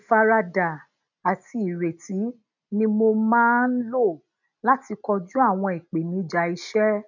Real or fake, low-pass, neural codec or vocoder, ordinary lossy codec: real; 7.2 kHz; none; none